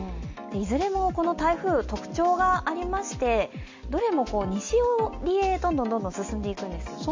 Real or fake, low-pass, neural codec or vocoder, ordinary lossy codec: real; 7.2 kHz; none; MP3, 64 kbps